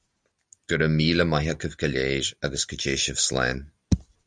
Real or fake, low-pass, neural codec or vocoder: real; 9.9 kHz; none